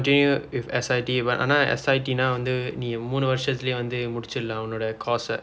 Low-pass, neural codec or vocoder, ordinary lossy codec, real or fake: none; none; none; real